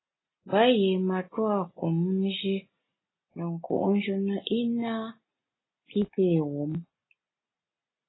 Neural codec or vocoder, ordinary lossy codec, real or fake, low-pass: none; AAC, 16 kbps; real; 7.2 kHz